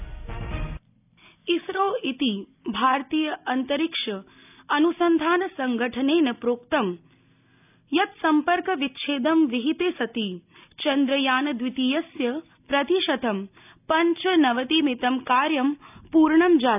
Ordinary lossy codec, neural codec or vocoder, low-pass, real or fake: none; none; 3.6 kHz; real